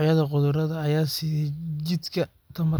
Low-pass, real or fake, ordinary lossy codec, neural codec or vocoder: none; real; none; none